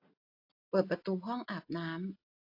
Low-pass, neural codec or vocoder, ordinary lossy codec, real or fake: 5.4 kHz; none; AAC, 32 kbps; real